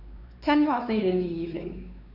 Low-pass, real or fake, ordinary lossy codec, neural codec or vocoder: 5.4 kHz; fake; none; codec, 16 kHz, 4 kbps, X-Codec, WavLM features, trained on Multilingual LibriSpeech